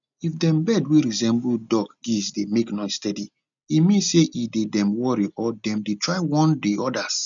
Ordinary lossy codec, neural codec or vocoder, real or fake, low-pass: none; none; real; 7.2 kHz